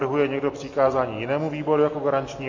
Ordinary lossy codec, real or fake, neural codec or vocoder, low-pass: MP3, 32 kbps; real; none; 7.2 kHz